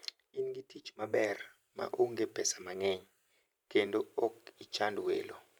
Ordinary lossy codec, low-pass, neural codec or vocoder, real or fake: none; none; vocoder, 44.1 kHz, 128 mel bands every 512 samples, BigVGAN v2; fake